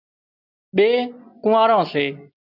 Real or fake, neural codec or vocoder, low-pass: real; none; 5.4 kHz